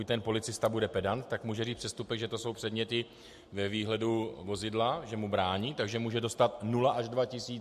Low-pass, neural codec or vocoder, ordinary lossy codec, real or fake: 14.4 kHz; none; MP3, 64 kbps; real